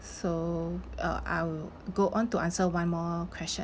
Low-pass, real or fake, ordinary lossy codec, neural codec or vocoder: none; real; none; none